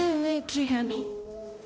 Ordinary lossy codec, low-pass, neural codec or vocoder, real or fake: none; none; codec, 16 kHz, 0.5 kbps, X-Codec, HuBERT features, trained on balanced general audio; fake